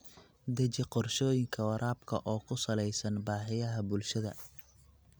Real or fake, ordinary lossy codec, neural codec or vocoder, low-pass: real; none; none; none